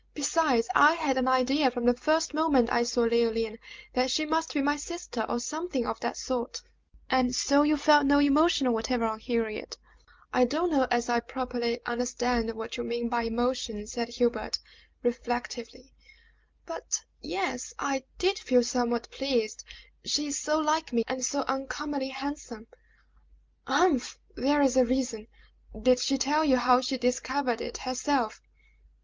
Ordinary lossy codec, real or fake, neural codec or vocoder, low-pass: Opus, 32 kbps; real; none; 7.2 kHz